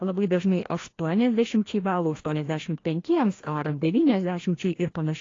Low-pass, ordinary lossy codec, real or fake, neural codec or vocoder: 7.2 kHz; AAC, 32 kbps; fake; codec, 16 kHz, 1 kbps, FreqCodec, larger model